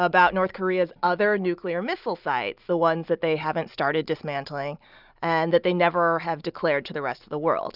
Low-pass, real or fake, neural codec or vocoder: 5.4 kHz; real; none